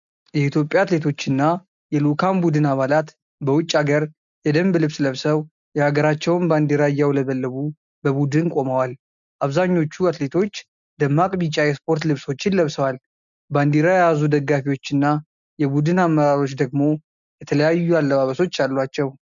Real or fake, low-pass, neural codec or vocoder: real; 7.2 kHz; none